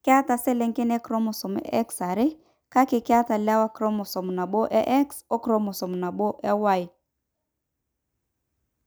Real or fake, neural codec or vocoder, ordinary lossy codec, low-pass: real; none; none; none